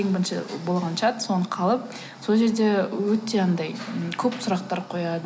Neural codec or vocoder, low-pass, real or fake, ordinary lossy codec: none; none; real; none